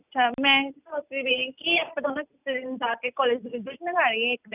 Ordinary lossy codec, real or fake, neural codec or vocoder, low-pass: none; real; none; 3.6 kHz